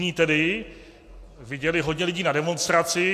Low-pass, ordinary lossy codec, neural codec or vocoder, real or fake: 14.4 kHz; AAC, 64 kbps; none; real